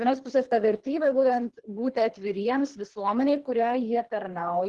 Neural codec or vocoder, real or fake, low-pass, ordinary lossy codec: codec, 24 kHz, 3 kbps, HILCodec; fake; 10.8 kHz; Opus, 16 kbps